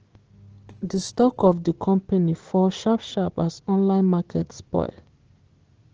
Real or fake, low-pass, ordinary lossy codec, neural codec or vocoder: fake; 7.2 kHz; Opus, 16 kbps; codec, 16 kHz, 6 kbps, DAC